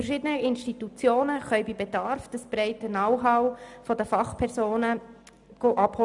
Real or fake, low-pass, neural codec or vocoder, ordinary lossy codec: real; 10.8 kHz; none; none